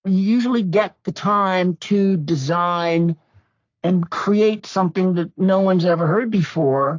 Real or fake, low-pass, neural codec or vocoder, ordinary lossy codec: fake; 7.2 kHz; codec, 44.1 kHz, 3.4 kbps, Pupu-Codec; AAC, 48 kbps